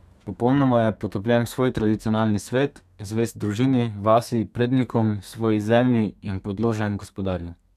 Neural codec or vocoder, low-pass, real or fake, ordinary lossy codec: codec, 32 kHz, 1.9 kbps, SNAC; 14.4 kHz; fake; none